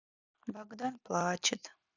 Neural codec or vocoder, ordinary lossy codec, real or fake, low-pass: none; none; real; 7.2 kHz